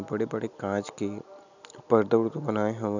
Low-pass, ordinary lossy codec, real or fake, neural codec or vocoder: 7.2 kHz; none; real; none